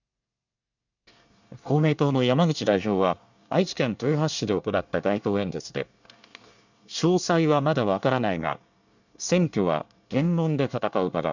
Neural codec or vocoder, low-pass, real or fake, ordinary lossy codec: codec, 24 kHz, 1 kbps, SNAC; 7.2 kHz; fake; none